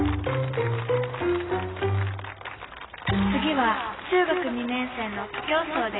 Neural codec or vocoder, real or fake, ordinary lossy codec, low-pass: none; real; AAC, 16 kbps; 7.2 kHz